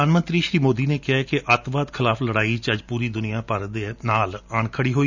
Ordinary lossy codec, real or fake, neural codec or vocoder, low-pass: none; real; none; 7.2 kHz